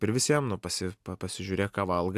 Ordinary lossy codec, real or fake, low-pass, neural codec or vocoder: AAC, 96 kbps; real; 14.4 kHz; none